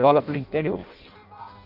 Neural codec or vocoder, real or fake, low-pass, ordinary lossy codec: codec, 16 kHz in and 24 kHz out, 0.6 kbps, FireRedTTS-2 codec; fake; 5.4 kHz; none